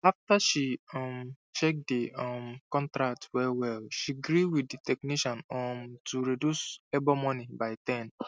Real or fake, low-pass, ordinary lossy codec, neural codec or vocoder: real; none; none; none